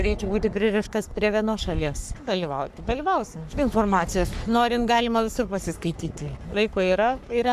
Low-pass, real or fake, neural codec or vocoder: 14.4 kHz; fake; codec, 44.1 kHz, 3.4 kbps, Pupu-Codec